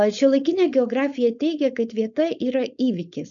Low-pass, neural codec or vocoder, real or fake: 7.2 kHz; codec, 16 kHz, 4.8 kbps, FACodec; fake